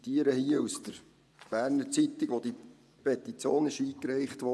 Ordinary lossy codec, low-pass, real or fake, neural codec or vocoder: none; none; fake; vocoder, 24 kHz, 100 mel bands, Vocos